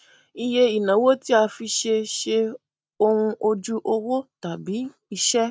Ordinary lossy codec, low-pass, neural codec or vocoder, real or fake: none; none; none; real